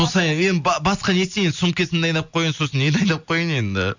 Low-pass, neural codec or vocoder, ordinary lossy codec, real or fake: 7.2 kHz; none; none; real